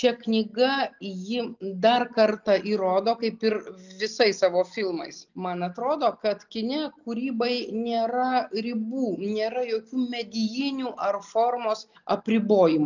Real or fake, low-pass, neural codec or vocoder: real; 7.2 kHz; none